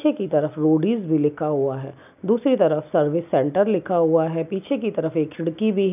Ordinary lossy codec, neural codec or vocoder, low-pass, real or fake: none; none; 3.6 kHz; real